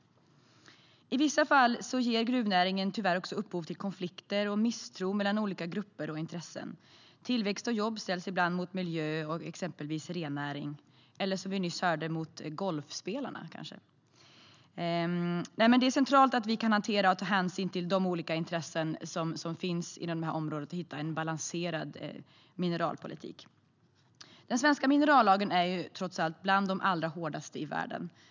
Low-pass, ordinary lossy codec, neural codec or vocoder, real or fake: 7.2 kHz; none; none; real